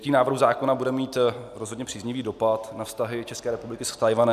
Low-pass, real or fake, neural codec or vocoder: 14.4 kHz; real; none